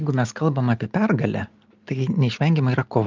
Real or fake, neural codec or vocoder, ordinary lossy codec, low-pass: real; none; Opus, 24 kbps; 7.2 kHz